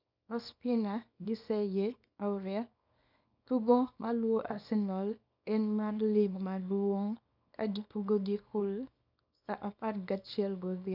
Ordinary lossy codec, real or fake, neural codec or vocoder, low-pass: none; fake; codec, 24 kHz, 0.9 kbps, WavTokenizer, small release; 5.4 kHz